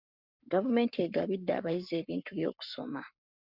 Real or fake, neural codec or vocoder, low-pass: fake; codec, 44.1 kHz, 7.8 kbps, Pupu-Codec; 5.4 kHz